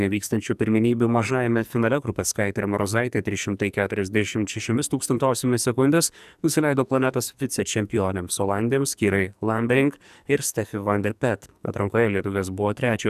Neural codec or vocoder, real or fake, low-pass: codec, 44.1 kHz, 2.6 kbps, SNAC; fake; 14.4 kHz